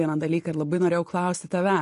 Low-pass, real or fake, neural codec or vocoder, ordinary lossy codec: 14.4 kHz; real; none; MP3, 48 kbps